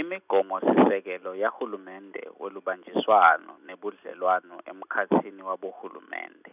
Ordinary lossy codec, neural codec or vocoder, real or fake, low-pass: none; none; real; 3.6 kHz